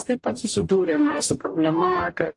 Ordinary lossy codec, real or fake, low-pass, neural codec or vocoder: AAC, 48 kbps; fake; 10.8 kHz; codec, 44.1 kHz, 0.9 kbps, DAC